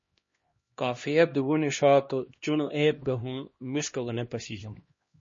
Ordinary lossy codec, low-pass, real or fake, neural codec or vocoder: MP3, 32 kbps; 7.2 kHz; fake; codec, 16 kHz, 1 kbps, X-Codec, HuBERT features, trained on LibriSpeech